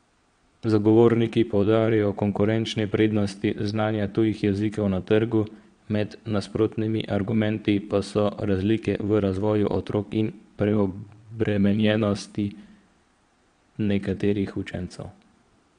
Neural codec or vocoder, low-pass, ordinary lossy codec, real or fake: vocoder, 22.05 kHz, 80 mel bands, Vocos; 9.9 kHz; MP3, 64 kbps; fake